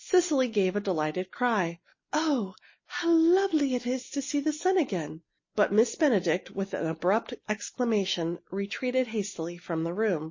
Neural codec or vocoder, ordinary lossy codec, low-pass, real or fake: none; MP3, 32 kbps; 7.2 kHz; real